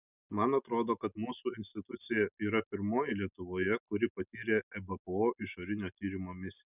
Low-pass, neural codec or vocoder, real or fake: 3.6 kHz; none; real